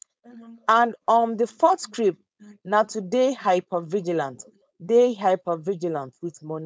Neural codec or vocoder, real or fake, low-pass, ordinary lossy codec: codec, 16 kHz, 4.8 kbps, FACodec; fake; none; none